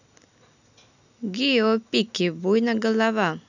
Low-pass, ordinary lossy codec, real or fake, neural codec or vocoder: 7.2 kHz; none; real; none